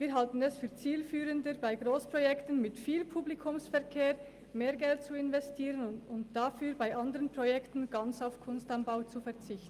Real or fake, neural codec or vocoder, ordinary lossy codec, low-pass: real; none; Opus, 32 kbps; 14.4 kHz